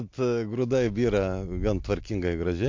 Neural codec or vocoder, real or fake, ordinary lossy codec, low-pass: none; real; MP3, 48 kbps; 7.2 kHz